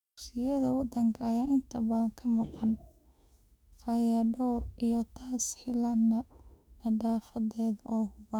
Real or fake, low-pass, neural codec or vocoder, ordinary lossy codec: fake; 19.8 kHz; autoencoder, 48 kHz, 32 numbers a frame, DAC-VAE, trained on Japanese speech; none